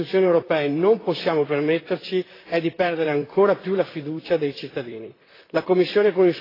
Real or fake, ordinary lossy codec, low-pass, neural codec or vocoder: fake; AAC, 24 kbps; 5.4 kHz; vocoder, 44.1 kHz, 128 mel bands, Pupu-Vocoder